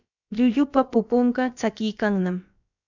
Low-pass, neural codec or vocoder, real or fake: 7.2 kHz; codec, 16 kHz, about 1 kbps, DyCAST, with the encoder's durations; fake